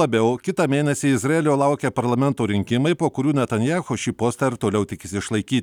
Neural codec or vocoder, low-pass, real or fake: none; 19.8 kHz; real